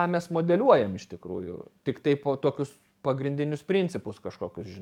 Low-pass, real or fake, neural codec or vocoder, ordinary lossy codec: 19.8 kHz; fake; codec, 44.1 kHz, 7.8 kbps, DAC; MP3, 96 kbps